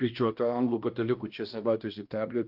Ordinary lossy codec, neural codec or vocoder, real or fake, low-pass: Opus, 32 kbps; codec, 16 kHz, 1 kbps, X-Codec, HuBERT features, trained on balanced general audio; fake; 5.4 kHz